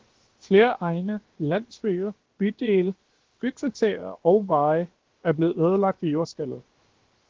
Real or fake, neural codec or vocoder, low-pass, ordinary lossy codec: fake; codec, 16 kHz, about 1 kbps, DyCAST, with the encoder's durations; 7.2 kHz; Opus, 16 kbps